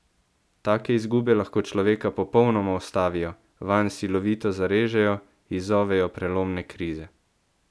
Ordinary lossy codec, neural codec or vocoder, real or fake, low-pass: none; none; real; none